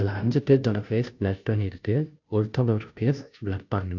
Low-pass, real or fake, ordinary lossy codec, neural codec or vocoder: 7.2 kHz; fake; none; codec, 16 kHz, 0.5 kbps, FunCodec, trained on Chinese and English, 25 frames a second